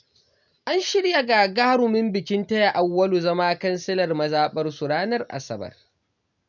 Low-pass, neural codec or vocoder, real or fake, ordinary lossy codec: 7.2 kHz; none; real; none